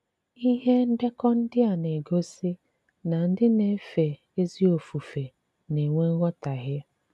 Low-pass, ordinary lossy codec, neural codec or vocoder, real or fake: none; none; none; real